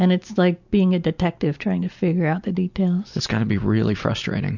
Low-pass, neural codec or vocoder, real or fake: 7.2 kHz; none; real